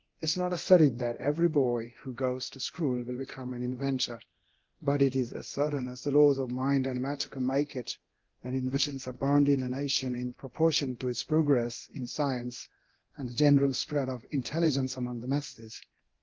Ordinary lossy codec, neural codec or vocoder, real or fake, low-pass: Opus, 16 kbps; codec, 24 kHz, 0.9 kbps, DualCodec; fake; 7.2 kHz